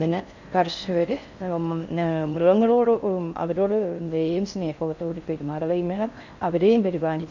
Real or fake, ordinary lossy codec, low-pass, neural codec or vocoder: fake; none; 7.2 kHz; codec, 16 kHz in and 24 kHz out, 0.6 kbps, FocalCodec, streaming, 4096 codes